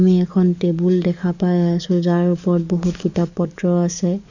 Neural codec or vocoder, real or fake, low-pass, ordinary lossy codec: codec, 44.1 kHz, 7.8 kbps, DAC; fake; 7.2 kHz; none